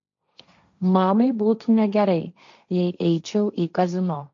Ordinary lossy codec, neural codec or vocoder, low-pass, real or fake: MP3, 48 kbps; codec, 16 kHz, 1.1 kbps, Voila-Tokenizer; 7.2 kHz; fake